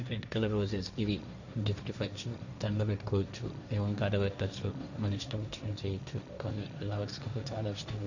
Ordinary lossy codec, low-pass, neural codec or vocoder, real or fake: none; 7.2 kHz; codec, 16 kHz, 1.1 kbps, Voila-Tokenizer; fake